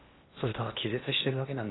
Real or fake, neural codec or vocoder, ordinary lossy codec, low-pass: fake; codec, 16 kHz in and 24 kHz out, 0.8 kbps, FocalCodec, streaming, 65536 codes; AAC, 16 kbps; 7.2 kHz